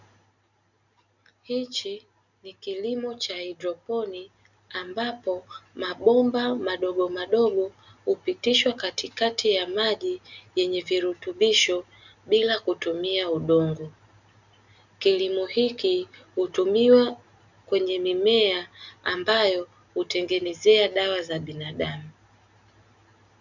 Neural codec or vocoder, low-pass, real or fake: none; 7.2 kHz; real